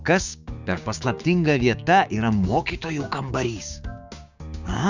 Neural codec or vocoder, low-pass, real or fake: codec, 16 kHz, 6 kbps, DAC; 7.2 kHz; fake